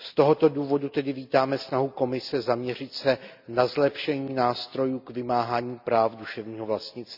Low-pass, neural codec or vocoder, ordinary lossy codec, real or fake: 5.4 kHz; none; none; real